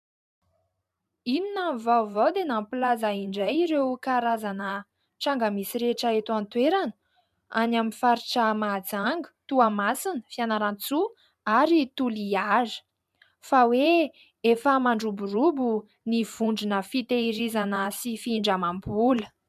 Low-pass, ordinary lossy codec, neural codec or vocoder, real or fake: 14.4 kHz; MP3, 96 kbps; vocoder, 44.1 kHz, 128 mel bands every 512 samples, BigVGAN v2; fake